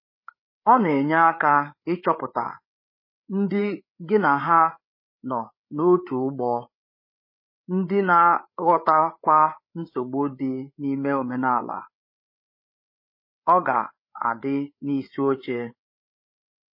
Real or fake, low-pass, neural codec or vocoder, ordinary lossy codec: fake; 5.4 kHz; codec, 16 kHz, 8 kbps, FreqCodec, larger model; MP3, 24 kbps